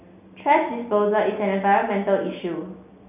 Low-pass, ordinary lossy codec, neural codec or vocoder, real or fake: 3.6 kHz; none; none; real